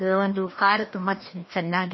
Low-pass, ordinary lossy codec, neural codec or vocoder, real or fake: 7.2 kHz; MP3, 24 kbps; codec, 16 kHz, 1 kbps, FreqCodec, larger model; fake